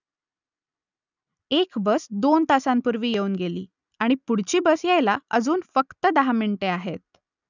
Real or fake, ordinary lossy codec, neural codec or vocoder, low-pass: real; none; none; 7.2 kHz